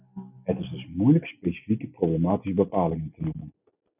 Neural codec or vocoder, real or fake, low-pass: none; real; 3.6 kHz